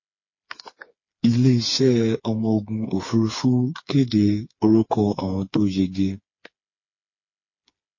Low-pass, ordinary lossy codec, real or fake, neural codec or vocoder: 7.2 kHz; MP3, 32 kbps; fake; codec, 16 kHz, 4 kbps, FreqCodec, smaller model